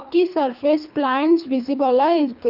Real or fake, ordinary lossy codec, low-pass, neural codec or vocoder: fake; none; 5.4 kHz; codec, 24 kHz, 3 kbps, HILCodec